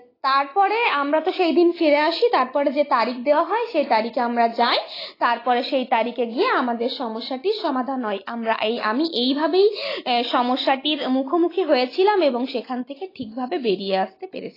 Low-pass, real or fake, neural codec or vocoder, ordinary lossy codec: 5.4 kHz; real; none; AAC, 24 kbps